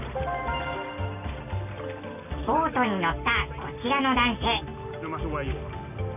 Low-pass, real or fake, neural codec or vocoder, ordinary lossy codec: 3.6 kHz; real; none; none